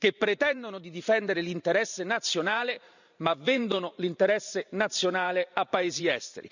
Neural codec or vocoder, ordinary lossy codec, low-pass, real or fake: vocoder, 44.1 kHz, 128 mel bands every 512 samples, BigVGAN v2; none; 7.2 kHz; fake